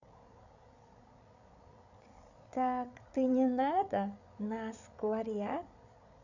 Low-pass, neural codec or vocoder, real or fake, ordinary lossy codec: 7.2 kHz; codec, 16 kHz, 8 kbps, FreqCodec, larger model; fake; none